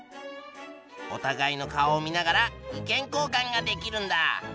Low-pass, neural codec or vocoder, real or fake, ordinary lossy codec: none; none; real; none